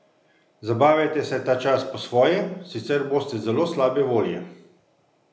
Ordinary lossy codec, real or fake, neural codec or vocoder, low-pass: none; real; none; none